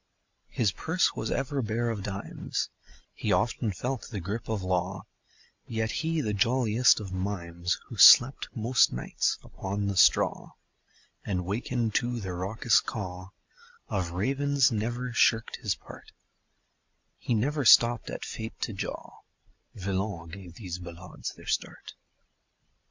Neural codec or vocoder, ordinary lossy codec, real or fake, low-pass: none; MP3, 64 kbps; real; 7.2 kHz